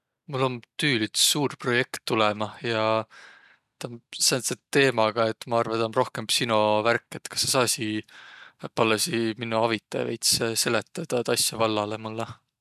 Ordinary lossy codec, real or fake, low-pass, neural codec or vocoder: none; real; 14.4 kHz; none